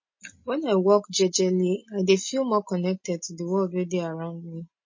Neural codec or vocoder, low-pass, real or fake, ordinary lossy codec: none; 7.2 kHz; real; MP3, 32 kbps